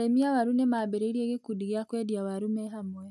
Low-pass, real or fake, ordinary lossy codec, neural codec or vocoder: none; real; none; none